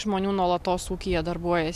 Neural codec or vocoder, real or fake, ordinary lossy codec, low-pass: none; real; AAC, 96 kbps; 14.4 kHz